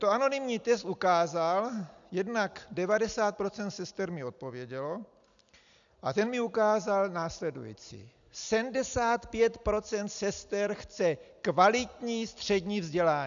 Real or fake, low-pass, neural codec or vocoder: real; 7.2 kHz; none